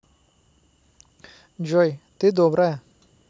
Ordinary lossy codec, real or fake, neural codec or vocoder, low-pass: none; real; none; none